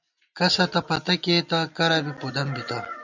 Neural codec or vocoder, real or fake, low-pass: none; real; 7.2 kHz